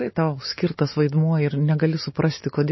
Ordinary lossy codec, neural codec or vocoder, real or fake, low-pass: MP3, 24 kbps; none; real; 7.2 kHz